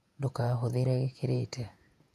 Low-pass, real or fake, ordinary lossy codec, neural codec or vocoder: 14.4 kHz; fake; none; vocoder, 48 kHz, 128 mel bands, Vocos